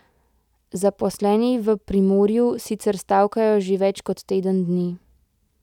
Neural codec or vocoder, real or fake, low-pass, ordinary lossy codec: none; real; 19.8 kHz; none